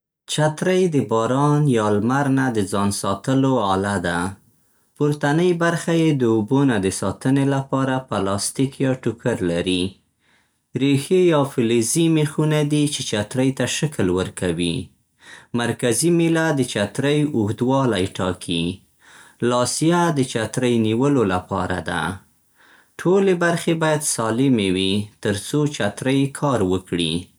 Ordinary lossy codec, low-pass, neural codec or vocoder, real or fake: none; none; none; real